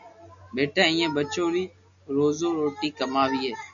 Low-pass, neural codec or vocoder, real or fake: 7.2 kHz; none; real